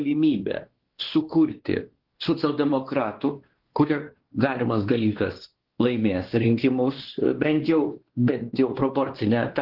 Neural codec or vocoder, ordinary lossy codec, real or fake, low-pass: codec, 16 kHz, 2 kbps, X-Codec, WavLM features, trained on Multilingual LibriSpeech; Opus, 16 kbps; fake; 5.4 kHz